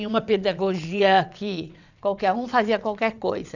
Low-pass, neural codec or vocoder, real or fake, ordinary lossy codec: 7.2 kHz; vocoder, 22.05 kHz, 80 mel bands, WaveNeXt; fake; none